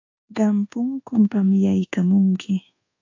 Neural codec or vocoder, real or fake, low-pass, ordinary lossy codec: codec, 24 kHz, 1.2 kbps, DualCodec; fake; 7.2 kHz; AAC, 48 kbps